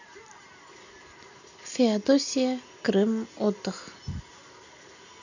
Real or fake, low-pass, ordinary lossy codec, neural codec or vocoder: real; 7.2 kHz; none; none